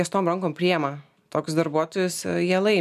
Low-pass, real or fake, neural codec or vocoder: 14.4 kHz; real; none